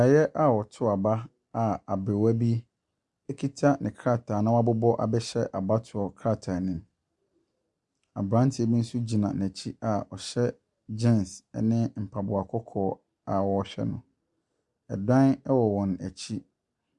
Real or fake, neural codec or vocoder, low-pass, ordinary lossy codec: real; none; 10.8 kHz; Opus, 64 kbps